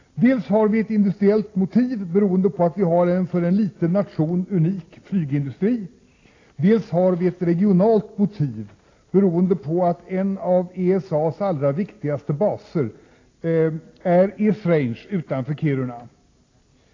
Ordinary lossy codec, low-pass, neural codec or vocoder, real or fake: AAC, 32 kbps; 7.2 kHz; none; real